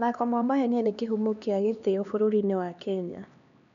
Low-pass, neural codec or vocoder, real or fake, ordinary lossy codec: 7.2 kHz; codec, 16 kHz, 2 kbps, X-Codec, HuBERT features, trained on LibriSpeech; fake; none